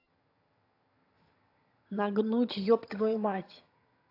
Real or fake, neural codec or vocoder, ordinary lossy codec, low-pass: fake; vocoder, 22.05 kHz, 80 mel bands, HiFi-GAN; AAC, 32 kbps; 5.4 kHz